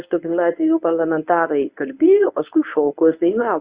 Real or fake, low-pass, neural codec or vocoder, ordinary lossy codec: fake; 3.6 kHz; codec, 24 kHz, 0.9 kbps, WavTokenizer, medium speech release version 1; Opus, 64 kbps